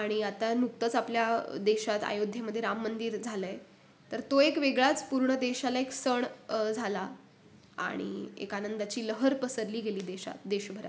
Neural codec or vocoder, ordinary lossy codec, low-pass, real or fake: none; none; none; real